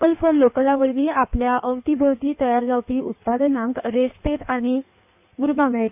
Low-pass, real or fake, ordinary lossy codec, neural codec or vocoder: 3.6 kHz; fake; none; codec, 16 kHz in and 24 kHz out, 1.1 kbps, FireRedTTS-2 codec